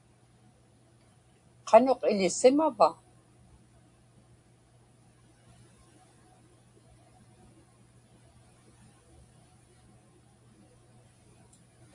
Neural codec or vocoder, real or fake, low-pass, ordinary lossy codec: none; real; 10.8 kHz; AAC, 64 kbps